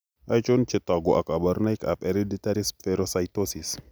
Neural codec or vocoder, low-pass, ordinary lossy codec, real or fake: none; none; none; real